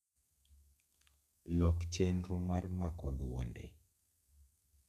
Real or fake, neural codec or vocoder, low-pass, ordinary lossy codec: fake; codec, 32 kHz, 1.9 kbps, SNAC; 14.4 kHz; none